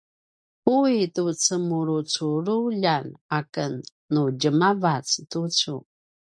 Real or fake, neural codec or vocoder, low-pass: real; none; 9.9 kHz